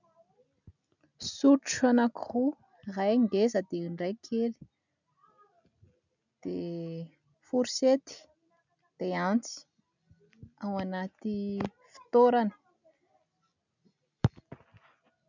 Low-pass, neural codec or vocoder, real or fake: 7.2 kHz; none; real